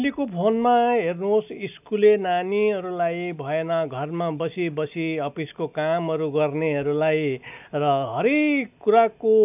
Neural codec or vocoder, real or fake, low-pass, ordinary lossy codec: none; real; 3.6 kHz; none